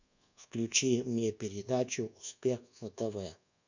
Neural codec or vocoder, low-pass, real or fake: codec, 24 kHz, 1.2 kbps, DualCodec; 7.2 kHz; fake